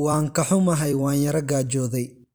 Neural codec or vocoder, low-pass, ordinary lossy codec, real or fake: vocoder, 44.1 kHz, 128 mel bands every 256 samples, BigVGAN v2; none; none; fake